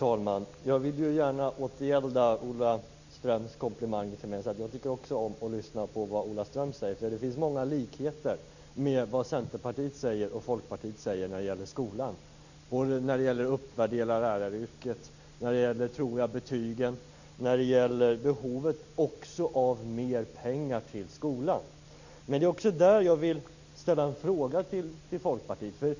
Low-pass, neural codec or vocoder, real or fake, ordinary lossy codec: 7.2 kHz; none; real; none